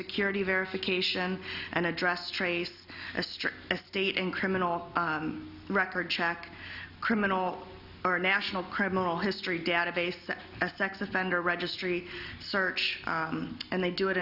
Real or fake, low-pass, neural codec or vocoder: real; 5.4 kHz; none